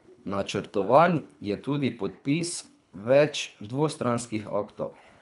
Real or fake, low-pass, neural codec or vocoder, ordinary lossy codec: fake; 10.8 kHz; codec, 24 kHz, 3 kbps, HILCodec; none